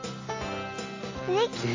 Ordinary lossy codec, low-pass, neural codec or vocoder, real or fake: MP3, 32 kbps; 7.2 kHz; none; real